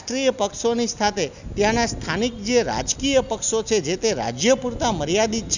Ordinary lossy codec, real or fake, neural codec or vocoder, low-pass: none; real; none; 7.2 kHz